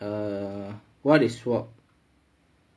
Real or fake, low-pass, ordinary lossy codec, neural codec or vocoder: real; none; none; none